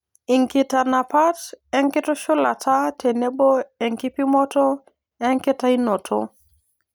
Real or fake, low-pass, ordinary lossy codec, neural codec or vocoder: real; none; none; none